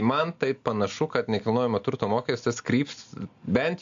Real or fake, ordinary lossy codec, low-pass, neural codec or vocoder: real; AAC, 64 kbps; 7.2 kHz; none